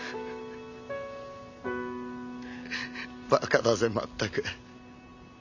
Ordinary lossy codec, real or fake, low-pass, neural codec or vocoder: none; real; 7.2 kHz; none